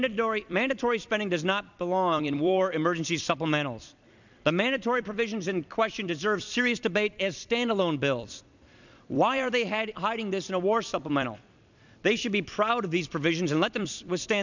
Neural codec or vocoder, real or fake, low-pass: vocoder, 44.1 kHz, 128 mel bands every 256 samples, BigVGAN v2; fake; 7.2 kHz